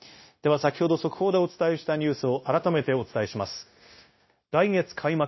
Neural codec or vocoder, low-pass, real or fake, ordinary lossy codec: codec, 16 kHz, 0.9 kbps, LongCat-Audio-Codec; 7.2 kHz; fake; MP3, 24 kbps